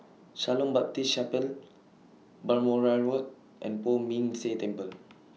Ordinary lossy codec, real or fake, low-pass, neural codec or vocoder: none; real; none; none